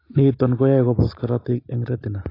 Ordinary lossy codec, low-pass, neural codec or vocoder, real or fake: AAC, 24 kbps; 5.4 kHz; none; real